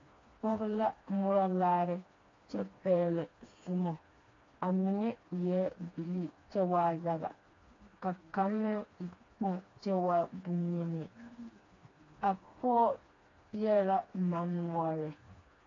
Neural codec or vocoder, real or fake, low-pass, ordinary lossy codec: codec, 16 kHz, 2 kbps, FreqCodec, smaller model; fake; 7.2 kHz; AAC, 32 kbps